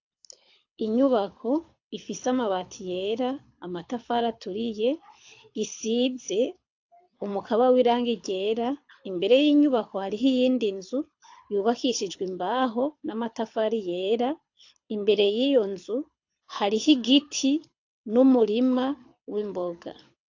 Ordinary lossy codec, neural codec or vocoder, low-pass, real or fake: AAC, 48 kbps; codec, 24 kHz, 6 kbps, HILCodec; 7.2 kHz; fake